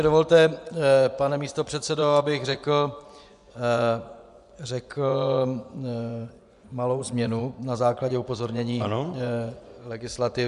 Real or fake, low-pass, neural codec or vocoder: fake; 10.8 kHz; vocoder, 24 kHz, 100 mel bands, Vocos